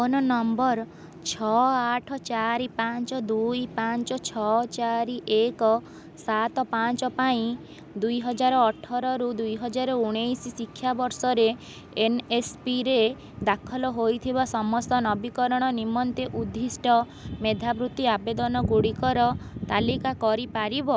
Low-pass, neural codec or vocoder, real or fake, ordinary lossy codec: none; none; real; none